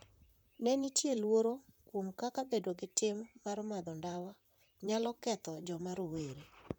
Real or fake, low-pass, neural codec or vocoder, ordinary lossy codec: fake; none; vocoder, 44.1 kHz, 128 mel bands, Pupu-Vocoder; none